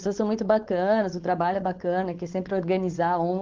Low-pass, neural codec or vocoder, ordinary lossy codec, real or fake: 7.2 kHz; codec, 16 kHz, 4.8 kbps, FACodec; Opus, 16 kbps; fake